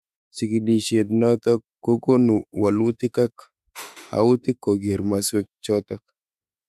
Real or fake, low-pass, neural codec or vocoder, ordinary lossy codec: fake; 14.4 kHz; autoencoder, 48 kHz, 32 numbers a frame, DAC-VAE, trained on Japanese speech; none